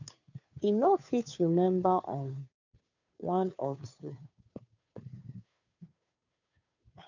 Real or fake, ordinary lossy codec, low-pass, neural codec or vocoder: fake; none; 7.2 kHz; codec, 16 kHz, 2 kbps, FunCodec, trained on Chinese and English, 25 frames a second